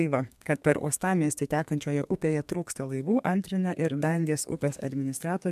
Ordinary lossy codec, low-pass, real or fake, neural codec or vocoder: MP3, 96 kbps; 14.4 kHz; fake; codec, 32 kHz, 1.9 kbps, SNAC